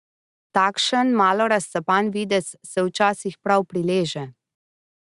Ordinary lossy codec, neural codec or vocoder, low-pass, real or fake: Opus, 64 kbps; none; 10.8 kHz; real